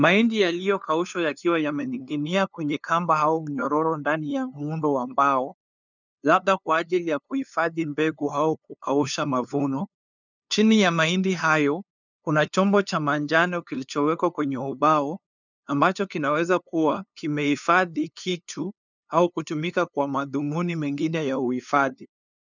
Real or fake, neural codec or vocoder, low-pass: fake; codec, 16 kHz, 2 kbps, FunCodec, trained on LibriTTS, 25 frames a second; 7.2 kHz